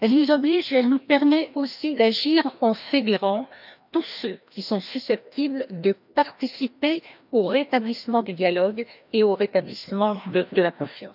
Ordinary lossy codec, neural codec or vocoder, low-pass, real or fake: none; codec, 16 kHz, 1 kbps, FreqCodec, larger model; 5.4 kHz; fake